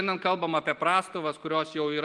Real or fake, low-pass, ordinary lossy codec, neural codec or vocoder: real; 10.8 kHz; Opus, 24 kbps; none